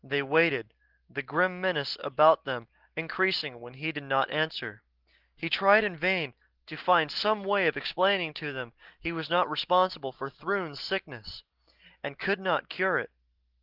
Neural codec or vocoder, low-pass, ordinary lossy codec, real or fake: none; 5.4 kHz; Opus, 16 kbps; real